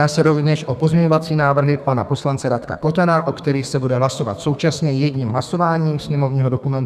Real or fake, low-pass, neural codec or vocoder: fake; 14.4 kHz; codec, 32 kHz, 1.9 kbps, SNAC